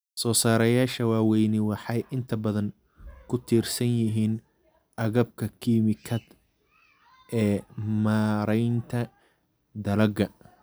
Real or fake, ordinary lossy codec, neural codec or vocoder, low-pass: real; none; none; none